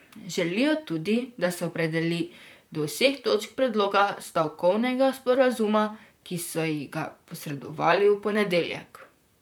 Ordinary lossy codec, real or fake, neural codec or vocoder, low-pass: none; fake; vocoder, 44.1 kHz, 128 mel bands, Pupu-Vocoder; none